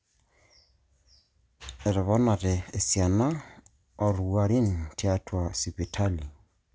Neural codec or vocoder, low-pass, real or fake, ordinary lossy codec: none; none; real; none